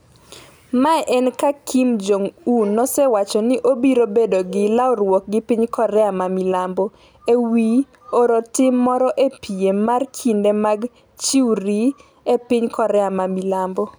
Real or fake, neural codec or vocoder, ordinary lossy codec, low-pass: real; none; none; none